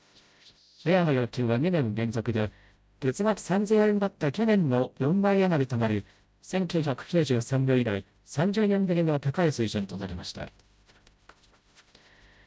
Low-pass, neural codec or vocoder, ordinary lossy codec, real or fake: none; codec, 16 kHz, 0.5 kbps, FreqCodec, smaller model; none; fake